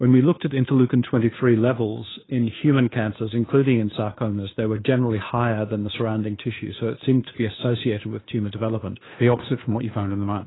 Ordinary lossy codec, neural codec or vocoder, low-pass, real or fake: AAC, 16 kbps; codec, 16 kHz, 2 kbps, FunCodec, trained on Chinese and English, 25 frames a second; 7.2 kHz; fake